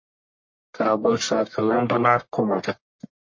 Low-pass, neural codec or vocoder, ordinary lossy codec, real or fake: 7.2 kHz; codec, 44.1 kHz, 1.7 kbps, Pupu-Codec; MP3, 32 kbps; fake